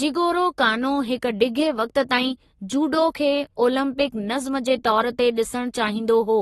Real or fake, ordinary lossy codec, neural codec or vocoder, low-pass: fake; AAC, 32 kbps; codec, 44.1 kHz, 7.8 kbps, Pupu-Codec; 19.8 kHz